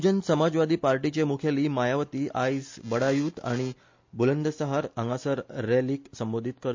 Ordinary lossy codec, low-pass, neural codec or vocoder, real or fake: MP3, 48 kbps; 7.2 kHz; none; real